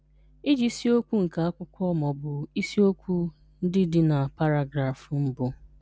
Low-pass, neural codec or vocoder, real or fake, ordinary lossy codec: none; none; real; none